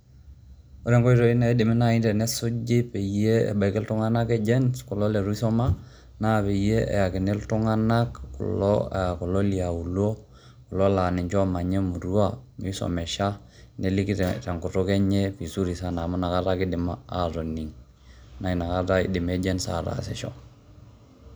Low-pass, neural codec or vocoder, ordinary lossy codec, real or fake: none; none; none; real